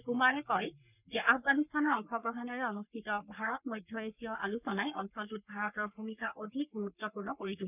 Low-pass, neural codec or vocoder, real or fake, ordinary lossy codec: 3.6 kHz; codec, 44.1 kHz, 3.4 kbps, Pupu-Codec; fake; none